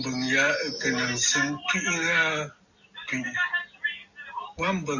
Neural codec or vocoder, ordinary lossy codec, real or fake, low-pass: none; Opus, 64 kbps; real; 7.2 kHz